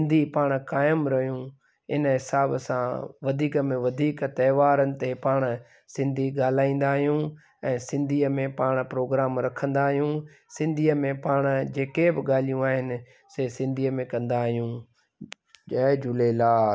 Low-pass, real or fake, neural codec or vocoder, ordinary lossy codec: none; real; none; none